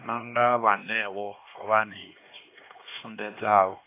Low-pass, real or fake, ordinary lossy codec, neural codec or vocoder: 3.6 kHz; fake; AAC, 24 kbps; codec, 16 kHz, 2 kbps, X-Codec, HuBERT features, trained on LibriSpeech